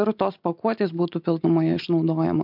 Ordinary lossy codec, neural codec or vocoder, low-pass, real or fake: MP3, 48 kbps; none; 5.4 kHz; real